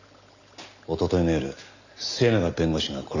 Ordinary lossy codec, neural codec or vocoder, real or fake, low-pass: none; none; real; 7.2 kHz